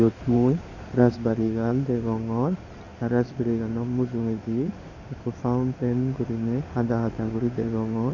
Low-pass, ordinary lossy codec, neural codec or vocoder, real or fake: 7.2 kHz; none; codec, 16 kHz in and 24 kHz out, 2.2 kbps, FireRedTTS-2 codec; fake